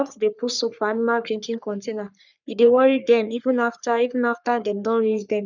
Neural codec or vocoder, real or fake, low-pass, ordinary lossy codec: codec, 44.1 kHz, 3.4 kbps, Pupu-Codec; fake; 7.2 kHz; none